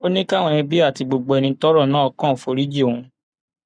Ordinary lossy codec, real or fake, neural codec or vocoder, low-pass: none; fake; codec, 44.1 kHz, 7.8 kbps, Pupu-Codec; 9.9 kHz